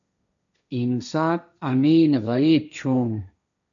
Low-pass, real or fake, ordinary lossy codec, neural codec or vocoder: 7.2 kHz; fake; MP3, 96 kbps; codec, 16 kHz, 1.1 kbps, Voila-Tokenizer